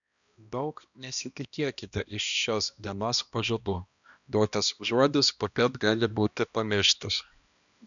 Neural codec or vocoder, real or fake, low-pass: codec, 16 kHz, 1 kbps, X-Codec, HuBERT features, trained on balanced general audio; fake; 7.2 kHz